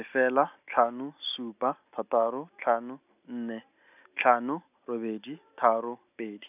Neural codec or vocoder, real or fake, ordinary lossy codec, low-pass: none; real; none; 3.6 kHz